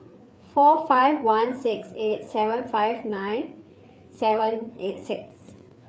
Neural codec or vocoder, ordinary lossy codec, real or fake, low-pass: codec, 16 kHz, 4 kbps, FreqCodec, larger model; none; fake; none